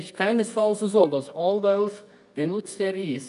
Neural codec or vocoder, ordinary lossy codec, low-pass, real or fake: codec, 24 kHz, 0.9 kbps, WavTokenizer, medium music audio release; none; 10.8 kHz; fake